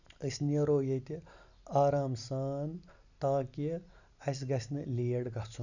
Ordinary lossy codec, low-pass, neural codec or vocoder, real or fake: none; 7.2 kHz; none; real